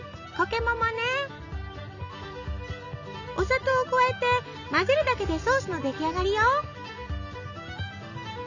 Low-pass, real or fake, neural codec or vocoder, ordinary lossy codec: 7.2 kHz; real; none; none